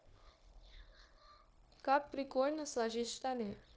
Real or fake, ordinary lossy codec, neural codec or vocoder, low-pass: fake; none; codec, 16 kHz, 0.9 kbps, LongCat-Audio-Codec; none